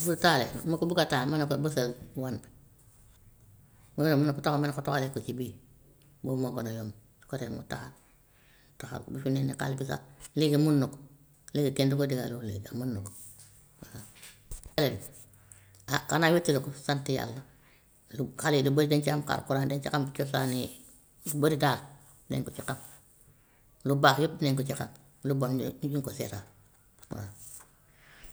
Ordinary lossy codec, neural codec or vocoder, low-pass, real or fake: none; none; none; real